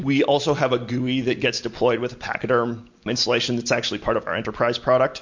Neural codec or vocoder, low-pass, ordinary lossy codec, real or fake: none; 7.2 kHz; MP3, 48 kbps; real